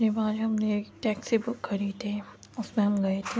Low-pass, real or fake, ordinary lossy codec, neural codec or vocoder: none; real; none; none